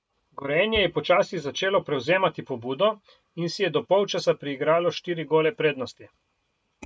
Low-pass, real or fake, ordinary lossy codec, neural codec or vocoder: none; real; none; none